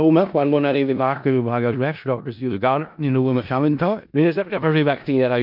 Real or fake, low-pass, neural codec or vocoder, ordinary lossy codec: fake; 5.4 kHz; codec, 16 kHz in and 24 kHz out, 0.4 kbps, LongCat-Audio-Codec, four codebook decoder; MP3, 48 kbps